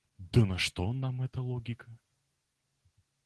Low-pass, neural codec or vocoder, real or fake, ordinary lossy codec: 10.8 kHz; none; real; Opus, 16 kbps